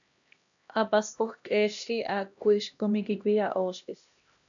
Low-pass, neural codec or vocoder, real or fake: 7.2 kHz; codec, 16 kHz, 1 kbps, X-Codec, HuBERT features, trained on LibriSpeech; fake